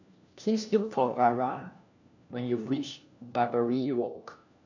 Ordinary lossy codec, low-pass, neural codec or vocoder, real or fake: none; 7.2 kHz; codec, 16 kHz, 1 kbps, FunCodec, trained on LibriTTS, 50 frames a second; fake